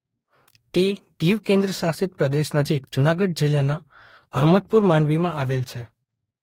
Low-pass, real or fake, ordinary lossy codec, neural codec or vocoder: 19.8 kHz; fake; AAC, 48 kbps; codec, 44.1 kHz, 2.6 kbps, DAC